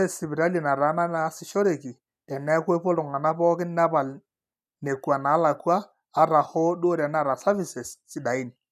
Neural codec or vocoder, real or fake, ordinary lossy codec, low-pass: none; real; none; 14.4 kHz